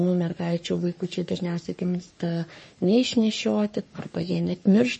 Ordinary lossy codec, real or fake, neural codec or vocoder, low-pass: MP3, 32 kbps; fake; codec, 44.1 kHz, 3.4 kbps, Pupu-Codec; 10.8 kHz